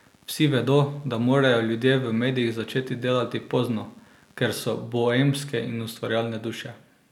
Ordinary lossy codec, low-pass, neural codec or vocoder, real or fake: none; 19.8 kHz; none; real